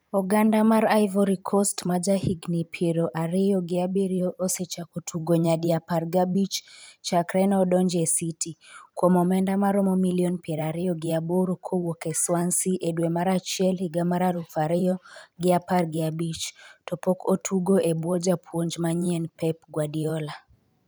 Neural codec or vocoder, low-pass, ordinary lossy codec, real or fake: vocoder, 44.1 kHz, 128 mel bands every 512 samples, BigVGAN v2; none; none; fake